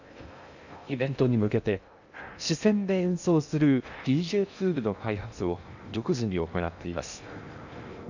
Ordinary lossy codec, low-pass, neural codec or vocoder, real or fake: none; 7.2 kHz; codec, 16 kHz in and 24 kHz out, 0.6 kbps, FocalCodec, streaming, 2048 codes; fake